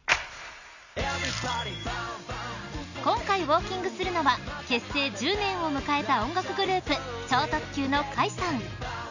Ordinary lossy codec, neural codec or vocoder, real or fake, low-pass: none; none; real; 7.2 kHz